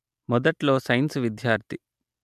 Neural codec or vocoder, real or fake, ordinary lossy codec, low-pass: none; real; MP3, 96 kbps; 14.4 kHz